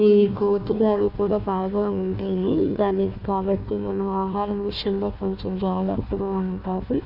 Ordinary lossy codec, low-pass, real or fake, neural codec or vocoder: none; 5.4 kHz; fake; codec, 16 kHz, 1 kbps, FunCodec, trained on Chinese and English, 50 frames a second